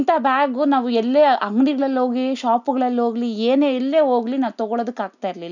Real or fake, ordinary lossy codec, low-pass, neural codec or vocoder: real; none; 7.2 kHz; none